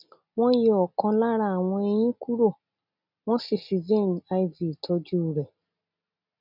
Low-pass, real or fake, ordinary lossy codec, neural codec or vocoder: 5.4 kHz; real; none; none